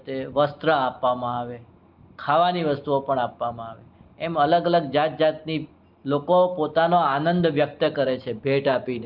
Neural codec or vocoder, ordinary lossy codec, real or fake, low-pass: none; Opus, 32 kbps; real; 5.4 kHz